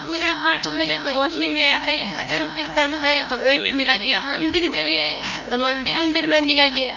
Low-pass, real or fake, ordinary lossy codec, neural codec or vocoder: 7.2 kHz; fake; none; codec, 16 kHz, 0.5 kbps, FreqCodec, larger model